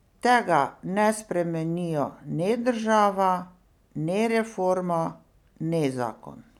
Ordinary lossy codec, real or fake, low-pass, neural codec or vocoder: none; real; 19.8 kHz; none